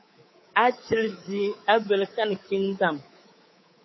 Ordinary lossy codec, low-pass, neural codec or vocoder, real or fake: MP3, 24 kbps; 7.2 kHz; codec, 24 kHz, 3.1 kbps, DualCodec; fake